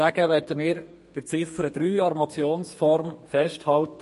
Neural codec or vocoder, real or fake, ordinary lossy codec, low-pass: codec, 32 kHz, 1.9 kbps, SNAC; fake; MP3, 48 kbps; 14.4 kHz